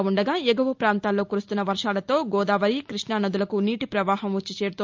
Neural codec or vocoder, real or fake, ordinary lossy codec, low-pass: none; real; Opus, 24 kbps; 7.2 kHz